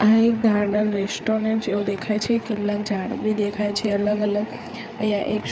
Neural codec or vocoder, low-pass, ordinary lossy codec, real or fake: codec, 16 kHz, 4 kbps, FreqCodec, larger model; none; none; fake